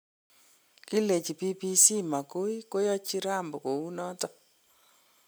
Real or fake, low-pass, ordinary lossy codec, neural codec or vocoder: real; none; none; none